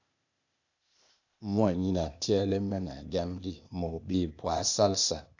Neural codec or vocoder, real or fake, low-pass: codec, 16 kHz, 0.8 kbps, ZipCodec; fake; 7.2 kHz